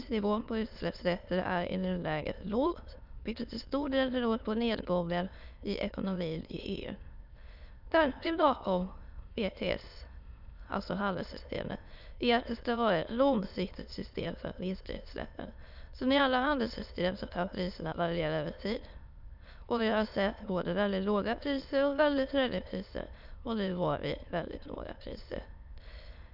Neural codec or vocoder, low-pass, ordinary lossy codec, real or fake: autoencoder, 22.05 kHz, a latent of 192 numbers a frame, VITS, trained on many speakers; 5.4 kHz; none; fake